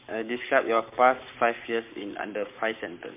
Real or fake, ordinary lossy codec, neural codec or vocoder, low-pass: fake; MP3, 32 kbps; codec, 44.1 kHz, 7.8 kbps, Pupu-Codec; 3.6 kHz